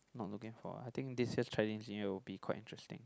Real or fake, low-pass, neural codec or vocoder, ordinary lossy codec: real; none; none; none